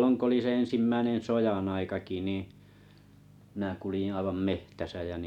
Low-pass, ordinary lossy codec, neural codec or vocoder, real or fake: 19.8 kHz; none; none; real